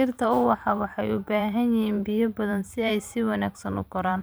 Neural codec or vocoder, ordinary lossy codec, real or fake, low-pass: vocoder, 44.1 kHz, 128 mel bands every 512 samples, BigVGAN v2; none; fake; none